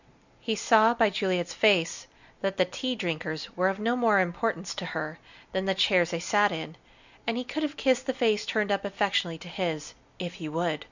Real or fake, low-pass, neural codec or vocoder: real; 7.2 kHz; none